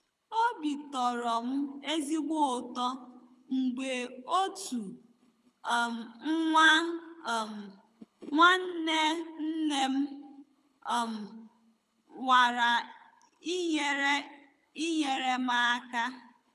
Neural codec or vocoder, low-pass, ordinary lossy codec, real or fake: codec, 24 kHz, 6 kbps, HILCodec; none; none; fake